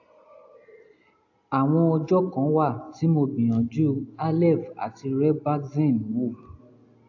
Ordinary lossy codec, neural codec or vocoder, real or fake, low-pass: AAC, 48 kbps; none; real; 7.2 kHz